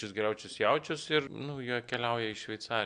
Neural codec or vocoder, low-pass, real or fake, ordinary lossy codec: none; 9.9 kHz; real; MP3, 64 kbps